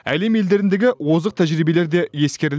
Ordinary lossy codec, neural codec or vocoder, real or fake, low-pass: none; none; real; none